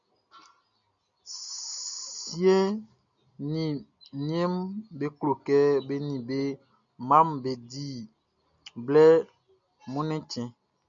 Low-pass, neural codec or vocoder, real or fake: 7.2 kHz; none; real